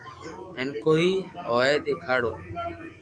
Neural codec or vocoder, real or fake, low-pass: codec, 44.1 kHz, 7.8 kbps, DAC; fake; 9.9 kHz